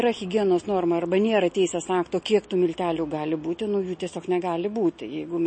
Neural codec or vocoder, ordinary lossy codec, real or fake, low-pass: none; MP3, 32 kbps; real; 9.9 kHz